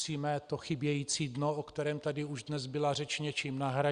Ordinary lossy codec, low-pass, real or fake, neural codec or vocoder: Opus, 64 kbps; 9.9 kHz; real; none